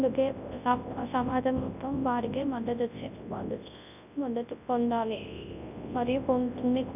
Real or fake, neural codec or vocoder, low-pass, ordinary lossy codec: fake; codec, 24 kHz, 0.9 kbps, WavTokenizer, large speech release; 3.6 kHz; none